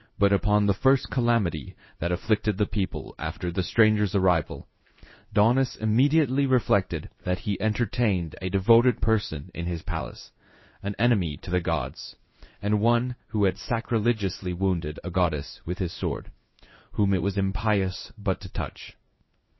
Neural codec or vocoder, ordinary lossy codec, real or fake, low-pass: codec, 16 kHz in and 24 kHz out, 1 kbps, XY-Tokenizer; MP3, 24 kbps; fake; 7.2 kHz